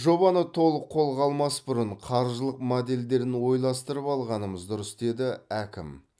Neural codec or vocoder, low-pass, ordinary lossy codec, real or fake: none; none; none; real